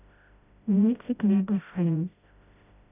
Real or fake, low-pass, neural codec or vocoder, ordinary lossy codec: fake; 3.6 kHz; codec, 16 kHz, 0.5 kbps, FreqCodec, smaller model; none